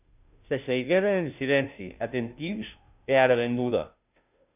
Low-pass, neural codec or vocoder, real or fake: 3.6 kHz; codec, 16 kHz, 0.5 kbps, FunCodec, trained on Chinese and English, 25 frames a second; fake